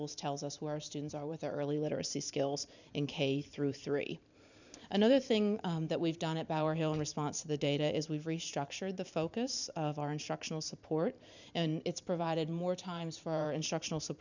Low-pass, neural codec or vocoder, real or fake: 7.2 kHz; vocoder, 44.1 kHz, 128 mel bands every 512 samples, BigVGAN v2; fake